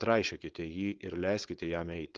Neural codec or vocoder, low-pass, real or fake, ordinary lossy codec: codec, 16 kHz, 4.8 kbps, FACodec; 7.2 kHz; fake; Opus, 16 kbps